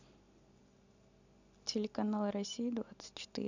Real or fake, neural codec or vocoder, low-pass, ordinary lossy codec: real; none; 7.2 kHz; none